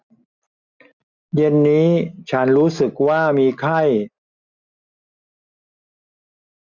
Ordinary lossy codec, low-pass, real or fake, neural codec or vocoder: none; 7.2 kHz; real; none